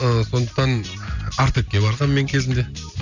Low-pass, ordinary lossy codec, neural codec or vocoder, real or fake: 7.2 kHz; none; none; real